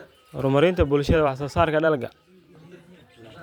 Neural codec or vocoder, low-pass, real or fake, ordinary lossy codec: vocoder, 44.1 kHz, 128 mel bands every 512 samples, BigVGAN v2; 19.8 kHz; fake; none